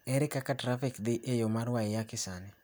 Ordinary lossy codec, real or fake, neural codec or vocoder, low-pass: none; real; none; none